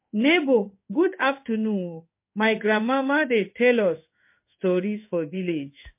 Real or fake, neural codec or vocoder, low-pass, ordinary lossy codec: fake; codec, 16 kHz in and 24 kHz out, 1 kbps, XY-Tokenizer; 3.6 kHz; MP3, 24 kbps